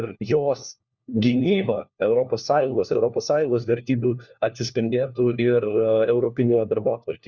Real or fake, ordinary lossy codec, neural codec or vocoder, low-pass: fake; Opus, 64 kbps; codec, 16 kHz, 1 kbps, FunCodec, trained on LibriTTS, 50 frames a second; 7.2 kHz